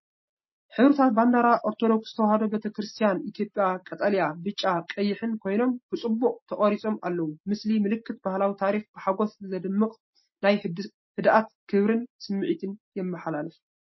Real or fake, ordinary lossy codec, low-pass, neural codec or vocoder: real; MP3, 24 kbps; 7.2 kHz; none